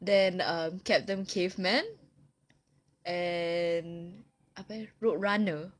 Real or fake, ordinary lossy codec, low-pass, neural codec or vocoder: real; none; 9.9 kHz; none